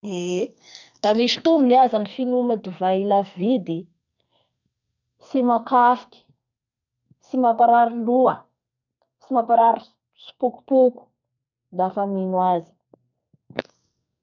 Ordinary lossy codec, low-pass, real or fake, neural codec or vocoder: none; 7.2 kHz; fake; codec, 44.1 kHz, 2.6 kbps, SNAC